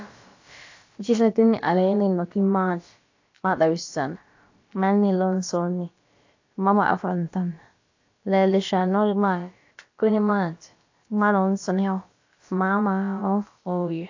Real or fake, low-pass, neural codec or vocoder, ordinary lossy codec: fake; 7.2 kHz; codec, 16 kHz, about 1 kbps, DyCAST, with the encoder's durations; AAC, 48 kbps